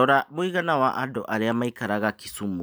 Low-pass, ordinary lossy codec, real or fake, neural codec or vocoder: none; none; real; none